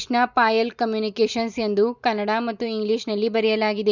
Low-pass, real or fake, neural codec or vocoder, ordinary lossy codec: 7.2 kHz; real; none; none